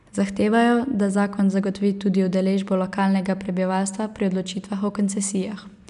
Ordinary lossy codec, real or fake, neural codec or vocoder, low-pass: none; real; none; 10.8 kHz